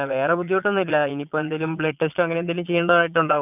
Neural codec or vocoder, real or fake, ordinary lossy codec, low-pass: vocoder, 22.05 kHz, 80 mel bands, Vocos; fake; none; 3.6 kHz